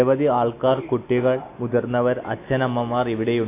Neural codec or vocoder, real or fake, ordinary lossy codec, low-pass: none; real; AAC, 24 kbps; 3.6 kHz